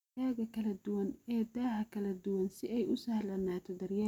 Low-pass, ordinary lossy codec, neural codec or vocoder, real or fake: 19.8 kHz; none; none; real